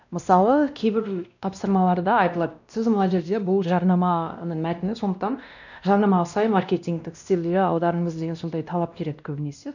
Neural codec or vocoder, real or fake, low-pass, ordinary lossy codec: codec, 16 kHz, 1 kbps, X-Codec, WavLM features, trained on Multilingual LibriSpeech; fake; 7.2 kHz; none